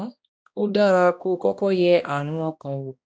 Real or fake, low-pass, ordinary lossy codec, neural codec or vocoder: fake; none; none; codec, 16 kHz, 1 kbps, X-Codec, HuBERT features, trained on balanced general audio